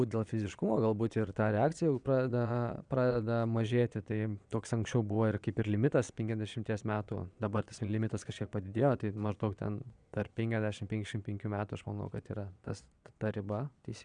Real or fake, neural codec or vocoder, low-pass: fake; vocoder, 22.05 kHz, 80 mel bands, Vocos; 9.9 kHz